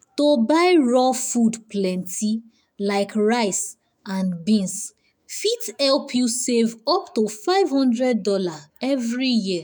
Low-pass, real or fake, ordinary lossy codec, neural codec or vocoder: none; fake; none; autoencoder, 48 kHz, 128 numbers a frame, DAC-VAE, trained on Japanese speech